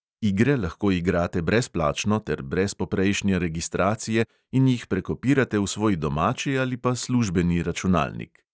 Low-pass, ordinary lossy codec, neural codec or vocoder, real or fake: none; none; none; real